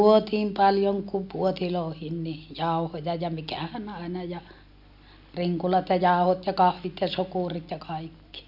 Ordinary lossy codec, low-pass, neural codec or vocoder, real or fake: none; 5.4 kHz; none; real